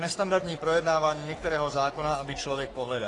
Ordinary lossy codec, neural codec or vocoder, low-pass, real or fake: AAC, 32 kbps; codec, 44.1 kHz, 3.4 kbps, Pupu-Codec; 10.8 kHz; fake